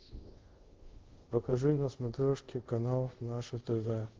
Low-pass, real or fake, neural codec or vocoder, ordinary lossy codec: 7.2 kHz; fake; codec, 24 kHz, 0.5 kbps, DualCodec; Opus, 16 kbps